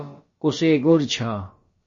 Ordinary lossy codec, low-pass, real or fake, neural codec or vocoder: MP3, 32 kbps; 7.2 kHz; fake; codec, 16 kHz, about 1 kbps, DyCAST, with the encoder's durations